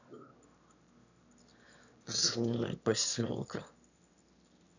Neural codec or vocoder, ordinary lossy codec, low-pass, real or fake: autoencoder, 22.05 kHz, a latent of 192 numbers a frame, VITS, trained on one speaker; none; 7.2 kHz; fake